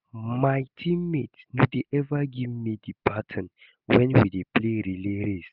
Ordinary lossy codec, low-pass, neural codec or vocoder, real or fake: none; 5.4 kHz; none; real